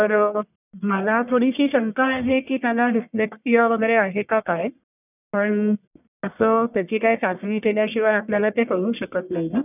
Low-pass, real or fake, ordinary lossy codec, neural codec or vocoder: 3.6 kHz; fake; none; codec, 44.1 kHz, 1.7 kbps, Pupu-Codec